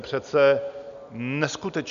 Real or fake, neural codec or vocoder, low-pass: real; none; 7.2 kHz